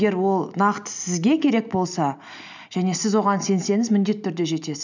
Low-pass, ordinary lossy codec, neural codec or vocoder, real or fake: 7.2 kHz; none; none; real